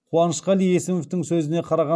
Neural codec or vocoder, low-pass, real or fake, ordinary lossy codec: none; none; real; none